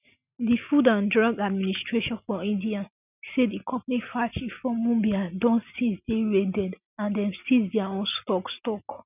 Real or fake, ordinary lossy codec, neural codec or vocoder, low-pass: real; none; none; 3.6 kHz